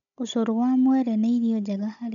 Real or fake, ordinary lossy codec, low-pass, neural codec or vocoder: fake; none; 7.2 kHz; codec, 16 kHz, 16 kbps, FunCodec, trained on Chinese and English, 50 frames a second